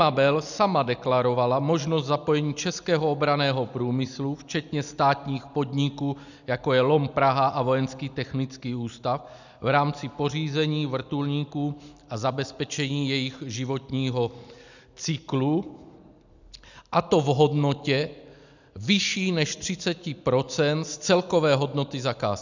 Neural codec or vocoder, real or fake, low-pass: none; real; 7.2 kHz